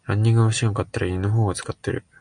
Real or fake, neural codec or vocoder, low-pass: real; none; 9.9 kHz